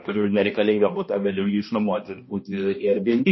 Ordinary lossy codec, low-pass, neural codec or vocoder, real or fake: MP3, 24 kbps; 7.2 kHz; codec, 16 kHz, 1 kbps, X-Codec, HuBERT features, trained on balanced general audio; fake